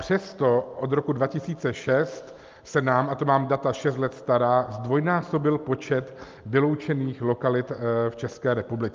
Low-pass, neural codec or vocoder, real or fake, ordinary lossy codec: 7.2 kHz; none; real; Opus, 24 kbps